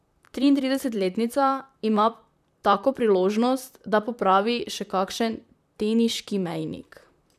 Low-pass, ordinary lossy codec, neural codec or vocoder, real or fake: 14.4 kHz; none; vocoder, 44.1 kHz, 128 mel bands, Pupu-Vocoder; fake